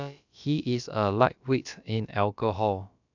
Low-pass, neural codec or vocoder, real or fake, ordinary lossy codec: 7.2 kHz; codec, 16 kHz, about 1 kbps, DyCAST, with the encoder's durations; fake; none